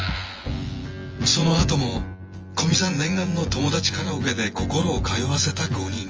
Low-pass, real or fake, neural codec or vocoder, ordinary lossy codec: 7.2 kHz; fake; vocoder, 24 kHz, 100 mel bands, Vocos; Opus, 24 kbps